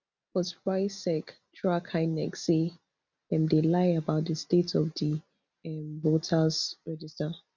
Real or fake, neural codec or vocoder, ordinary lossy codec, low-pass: real; none; none; 7.2 kHz